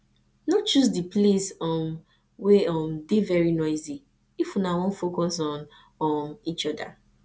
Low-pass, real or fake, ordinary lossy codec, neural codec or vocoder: none; real; none; none